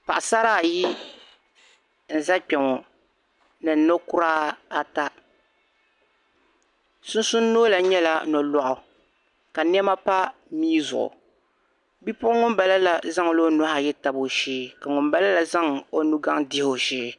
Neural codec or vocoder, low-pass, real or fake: none; 10.8 kHz; real